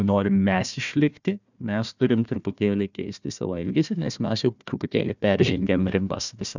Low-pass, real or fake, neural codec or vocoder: 7.2 kHz; fake; codec, 16 kHz, 1 kbps, FunCodec, trained on Chinese and English, 50 frames a second